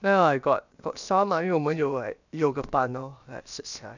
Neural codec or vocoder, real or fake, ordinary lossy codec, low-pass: codec, 16 kHz, about 1 kbps, DyCAST, with the encoder's durations; fake; none; 7.2 kHz